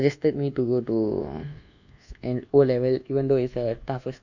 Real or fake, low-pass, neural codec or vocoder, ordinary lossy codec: fake; 7.2 kHz; codec, 24 kHz, 1.2 kbps, DualCodec; none